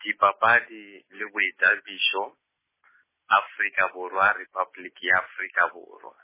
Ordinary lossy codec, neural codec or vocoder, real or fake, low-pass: MP3, 16 kbps; none; real; 3.6 kHz